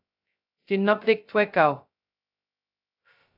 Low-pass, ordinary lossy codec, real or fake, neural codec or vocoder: 5.4 kHz; AAC, 48 kbps; fake; codec, 16 kHz, 0.2 kbps, FocalCodec